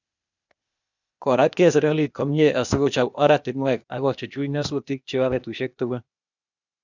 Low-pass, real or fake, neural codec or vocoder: 7.2 kHz; fake; codec, 16 kHz, 0.8 kbps, ZipCodec